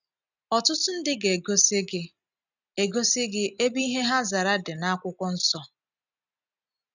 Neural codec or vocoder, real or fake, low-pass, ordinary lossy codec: none; real; 7.2 kHz; none